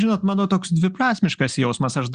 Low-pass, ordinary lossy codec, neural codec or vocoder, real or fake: 9.9 kHz; Opus, 64 kbps; none; real